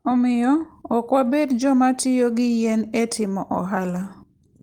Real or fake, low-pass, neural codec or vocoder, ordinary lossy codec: real; 19.8 kHz; none; Opus, 24 kbps